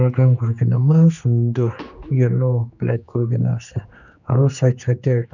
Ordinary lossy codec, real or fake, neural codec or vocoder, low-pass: none; fake; codec, 16 kHz, 2 kbps, X-Codec, HuBERT features, trained on general audio; 7.2 kHz